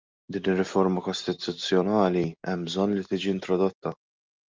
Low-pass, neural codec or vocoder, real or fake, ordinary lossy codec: 7.2 kHz; none; real; Opus, 24 kbps